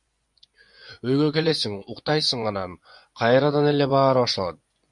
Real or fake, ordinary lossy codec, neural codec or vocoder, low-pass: fake; MP3, 64 kbps; vocoder, 24 kHz, 100 mel bands, Vocos; 10.8 kHz